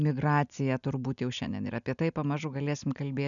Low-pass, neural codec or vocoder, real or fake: 7.2 kHz; none; real